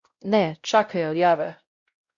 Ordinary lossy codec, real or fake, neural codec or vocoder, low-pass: Opus, 64 kbps; fake; codec, 16 kHz, 0.5 kbps, X-Codec, WavLM features, trained on Multilingual LibriSpeech; 7.2 kHz